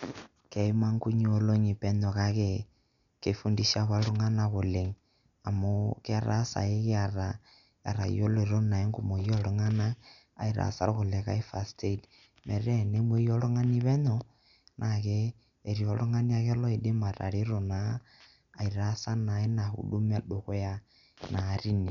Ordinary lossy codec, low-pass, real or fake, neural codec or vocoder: none; 7.2 kHz; real; none